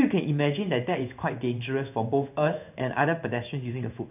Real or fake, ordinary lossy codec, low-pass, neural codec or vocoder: fake; none; 3.6 kHz; codec, 16 kHz in and 24 kHz out, 1 kbps, XY-Tokenizer